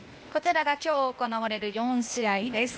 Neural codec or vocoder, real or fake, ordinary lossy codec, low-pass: codec, 16 kHz, 0.8 kbps, ZipCodec; fake; none; none